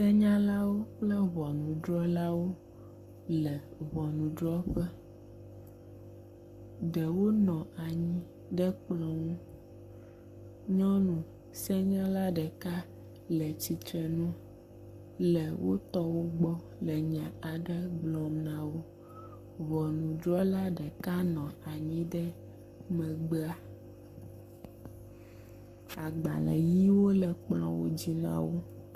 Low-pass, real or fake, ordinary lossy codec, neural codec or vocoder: 14.4 kHz; fake; Opus, 64 kbps; codec, 44.1 kHz, 7.8 kbps, Pupu-Codec